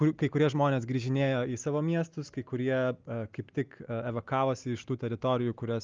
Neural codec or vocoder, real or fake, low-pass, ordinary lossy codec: none; real; 7.2 kHz; Opus, 24 kbps